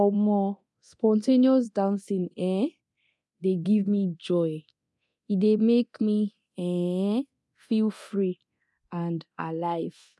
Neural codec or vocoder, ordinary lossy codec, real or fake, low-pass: codec, 24 kHz, 0.9 kbps, DualCodec; none; fake; none